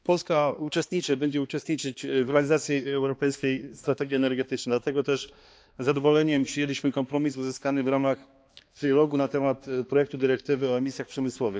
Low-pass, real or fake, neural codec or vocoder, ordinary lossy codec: none; fake; codec, 16 kHz, 2 kbps, X-Codec, HuBERT features, trained on balanced general audio; none